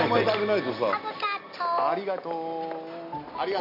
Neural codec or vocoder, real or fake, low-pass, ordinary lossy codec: none; real; 5.4 kHz; none